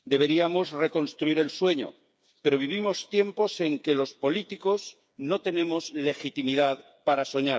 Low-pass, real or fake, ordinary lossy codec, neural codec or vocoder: none; fake; none; codec, 16 kHz, 4 kbps, FreqCodec, smaller model